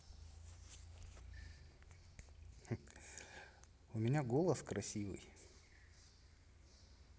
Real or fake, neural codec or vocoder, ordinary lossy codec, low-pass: real; none; none; none